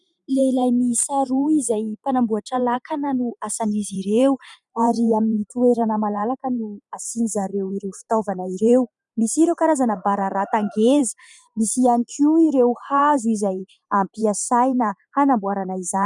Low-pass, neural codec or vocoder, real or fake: 10.8 kHz; vocoder, 44.1 kHz, 128 mel bands every 512 samples, BigVGAN v2; fake